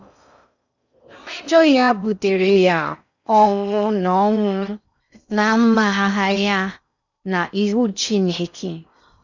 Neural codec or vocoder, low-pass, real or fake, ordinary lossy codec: codec, 16 kHz in and 24 kHz out, 0.6 kbps, FocalCodec, streaming, 4096 codes; 7.2 kHz; fake; none